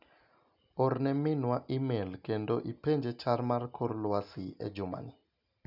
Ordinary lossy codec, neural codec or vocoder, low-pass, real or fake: none; none; 5.4 kHz; real